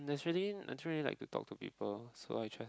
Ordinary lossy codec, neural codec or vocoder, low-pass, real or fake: none; none; none; real